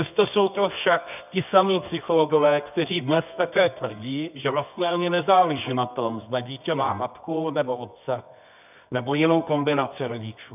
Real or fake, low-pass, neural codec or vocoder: fake; 3.6 kHz; codec, 24 kHz, 0.9 kbps, WavTokenizer, medium music audio release